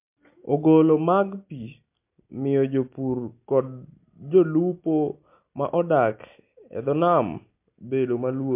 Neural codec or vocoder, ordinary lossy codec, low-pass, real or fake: none; MP3, 32 kbps; 3.6 kHz; real